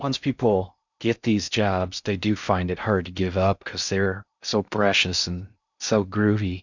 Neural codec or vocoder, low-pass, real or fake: codec, 16 kHz in and 24 kHz out, 0.6 kbps, FocalCodec, streaming, 4096 codes; 7.2 kHz; fake